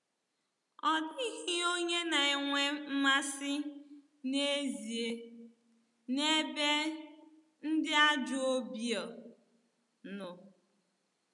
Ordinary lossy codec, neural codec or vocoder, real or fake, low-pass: none; none; real; 10.8 kHz